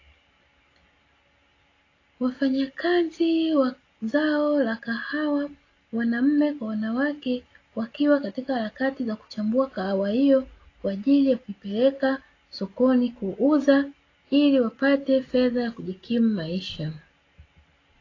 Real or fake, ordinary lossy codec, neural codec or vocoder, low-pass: real; AAC, 32 kbps; none; 7.2 kHz